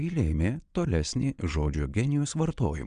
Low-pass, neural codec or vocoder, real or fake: 9.9 kHz; vocoder, 22.05 kHz, 80 mel bands, Vocos; fake